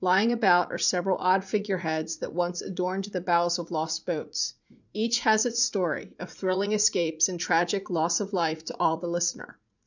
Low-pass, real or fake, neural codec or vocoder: 7.2 kHz; fake; vocoder, 44.1 kHz, 80 mel bands, Vocos